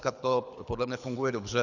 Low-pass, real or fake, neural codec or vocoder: 7.2 kHz; fake; codec, 24 kHz, 6 kbps, HILCodec